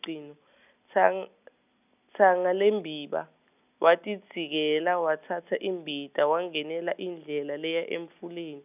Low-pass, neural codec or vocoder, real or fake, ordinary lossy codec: 3.6 kHz; none; real; none